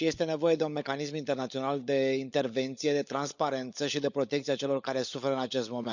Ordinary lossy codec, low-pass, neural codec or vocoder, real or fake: none; 7.2 kHz; codec, 16 kHz, 16 kbps, FunCodec, trained on LibriTTS, 50 frames a second; fake